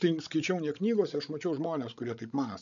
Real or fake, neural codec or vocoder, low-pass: fake; codec, 16 kHz, 16 kbps, FreqCodec, larger model; 7.2 kHz